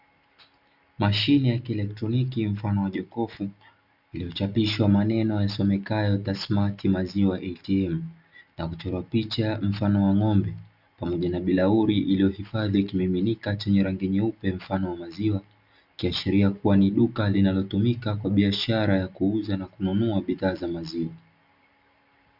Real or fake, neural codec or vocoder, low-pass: real; none; 5.4 kHz